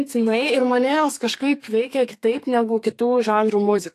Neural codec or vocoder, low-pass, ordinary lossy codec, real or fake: codec, 32 kHz, 1.9 kbps, SNAC; 14.4 kHz; AAC, 64 kbps; fake